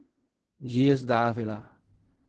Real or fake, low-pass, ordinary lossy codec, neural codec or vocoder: fake; 10.8 kHz; Opus, 16 kbps; codec, 16 kHz in and 24 kHz out, 0.4 kbps, LongCat-Audio-Codec, fine tuned four codebook decoder